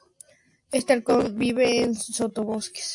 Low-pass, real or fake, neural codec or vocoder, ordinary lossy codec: 10.8 kHz; real; none; AAC, 64 kbps